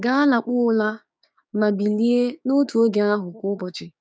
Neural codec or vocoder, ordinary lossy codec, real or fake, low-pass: codec, 16 kHz, 6 kbps, DAC; none; fake; none